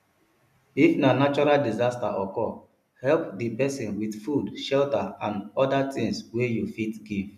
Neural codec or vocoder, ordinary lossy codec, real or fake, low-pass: none; none; real; 14.4 kHz